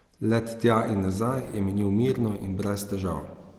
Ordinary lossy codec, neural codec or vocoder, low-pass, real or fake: Opus, 16 kbps; none; 19.8 kHz; real